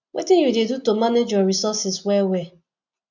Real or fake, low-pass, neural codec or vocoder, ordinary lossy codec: real; 7.2 kHz; none; none